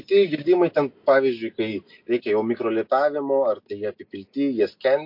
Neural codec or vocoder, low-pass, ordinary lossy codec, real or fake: none; 5.4 kHz; MP3, 32 kbps; real